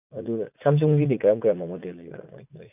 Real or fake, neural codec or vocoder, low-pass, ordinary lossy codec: fake; vocoder, 44.1 kHz, 80 mel bands, Vocos; 3.6 kHz; none